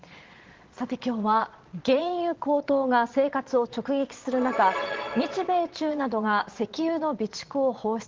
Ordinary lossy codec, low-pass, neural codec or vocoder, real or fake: Opus, 16 kbps; 7.2 kHz; vocoder, 22.05 kHz, 80 mel bands, Vocos; fake